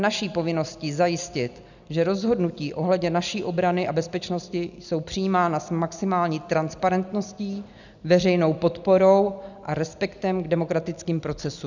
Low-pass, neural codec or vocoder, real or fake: 7.2 kHz; none; real